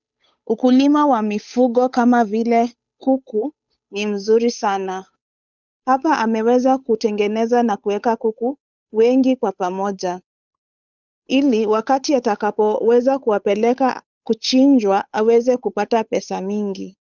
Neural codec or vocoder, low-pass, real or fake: codec, 16 kHz, 8 kbps, FunCodec, trained on Chinese and English, 25 frames a second; 7.2 kHz; fake